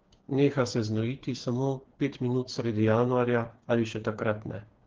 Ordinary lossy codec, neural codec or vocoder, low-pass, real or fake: Opus, 16 kbps; codec, 16 kHz, 4 kbps, FreqCodec, smaller model; 7.2 kHz; fake